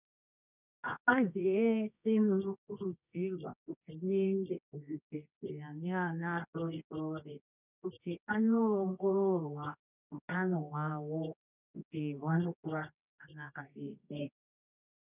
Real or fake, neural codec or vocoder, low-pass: fake; codec, 24 kHz, 0.9 kbps, WavTokenizer, medium music audio release; 3.6 kHz